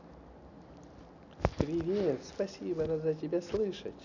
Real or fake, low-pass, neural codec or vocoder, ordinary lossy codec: real; 7.2 kHz; none; none